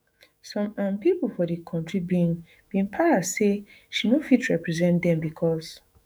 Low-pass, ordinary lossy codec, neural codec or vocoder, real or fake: none; none; autoencoder, 48 kHz, 128 numbers a frame, DAC-VAE, trained on Japanese speech; fake